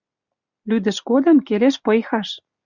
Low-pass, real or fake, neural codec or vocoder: 7.2 kHz; real; none